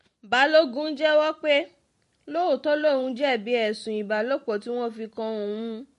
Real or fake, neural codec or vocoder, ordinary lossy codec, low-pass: fake; vocoder, 44.1 kHz, 128 mel bands every 256 samples, BigVGAN v2; MP3, 48 kbps; 14.4 kHz